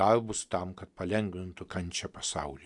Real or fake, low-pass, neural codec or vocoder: real; 10.8 kHz; none